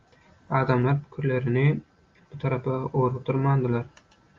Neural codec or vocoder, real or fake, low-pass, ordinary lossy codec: none; real; 7.2 kHz; Opus, 32 kbps